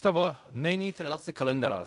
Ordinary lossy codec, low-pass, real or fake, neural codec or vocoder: AAC, 96 kbps; 10.8 kHz; fake; codec, 16 kHz in and 24 kHz out, 0.4 kbps, LongCat-Audio-Codec, fine tuned four codebook decoder